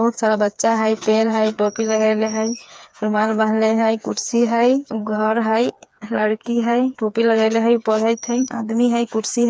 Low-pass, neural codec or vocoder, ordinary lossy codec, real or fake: none; codec, 16 kHz, 4 kbps, FreqCodec, smaller model; none; fake